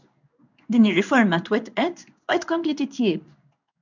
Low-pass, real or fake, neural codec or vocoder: 7.2 kHz; fake; codec, 16 kHz in and 24 kHz out, 1 kbps, XY-Tokenizer